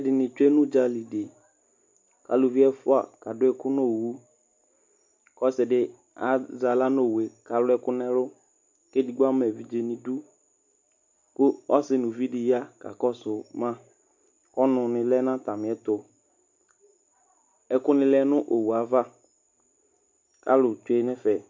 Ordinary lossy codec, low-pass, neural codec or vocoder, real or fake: MP3, 48 kbps; 7.2 kHz; none; real